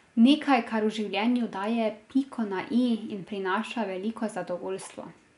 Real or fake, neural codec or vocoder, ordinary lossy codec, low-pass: real; none; none; 10.8 kHz